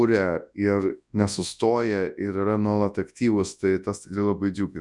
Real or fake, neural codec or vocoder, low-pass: fake; codec, 24 kHz, 0.9 kbps, WavTokenizer, large speech release; 10.8 kHz